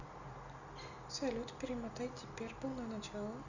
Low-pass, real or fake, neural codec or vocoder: 7.2 kHz; real; none